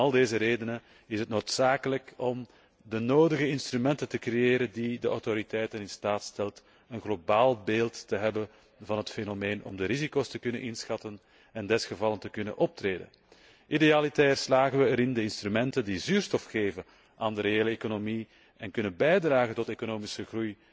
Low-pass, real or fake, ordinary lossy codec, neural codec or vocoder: none; real; none; none